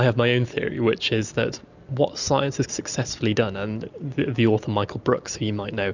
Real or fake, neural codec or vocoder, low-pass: real; none; 7.2 kHz